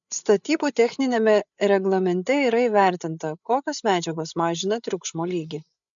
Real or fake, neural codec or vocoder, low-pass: fake; codec, 16 kHz, 8 kbps, FreqCodec, larger model; 7.2 kHz